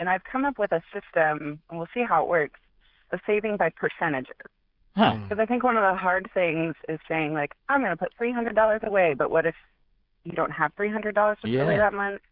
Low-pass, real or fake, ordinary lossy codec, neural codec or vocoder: 5.4 kHz; fake; Opus, 64 kbps; codec, 16 kHz, 16 kbps, FreqCodec, smaller model